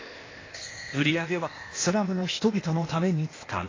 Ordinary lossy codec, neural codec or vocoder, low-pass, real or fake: AAC, 32 kbps; codec, 16 kHz, 0.8 kbps, ZipCodec; 7.2 kHz; fake